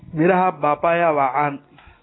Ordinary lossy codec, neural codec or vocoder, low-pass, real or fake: AAC, 16 kbps; none; 7.2 kHz; real